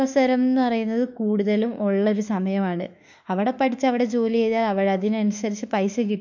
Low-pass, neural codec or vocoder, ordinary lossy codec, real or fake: 7.2 kHz; autoencoder, 48 kHz, 32 numbers a frame, DAC-VAE, trained on Japanese speech; none; fake